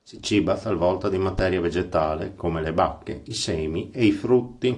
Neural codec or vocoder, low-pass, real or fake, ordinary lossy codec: none; 10.8 kHz; real; AAC, 48 kbps